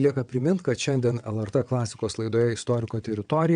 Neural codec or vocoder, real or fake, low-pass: vocoder, 22.05 kHz, 80 mel bands, WaveNeXt; fake; 9.9 kHz